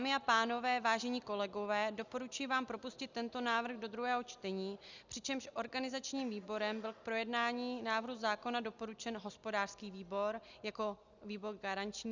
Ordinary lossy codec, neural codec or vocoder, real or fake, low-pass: Opus, 64 kbps; none; real; 7.2 kHz